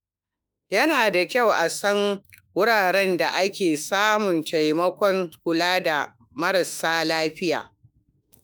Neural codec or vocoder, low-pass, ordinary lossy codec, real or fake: autoencoder, 48 kHz, 32 numbers a frame, DAC-VAE, trained on Japanese speech; none; none; fake